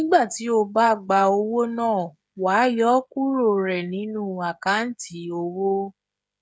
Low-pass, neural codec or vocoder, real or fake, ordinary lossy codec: none; codec, 16 kHz, 16 kbps, FreqCodec, smaller model; fake; none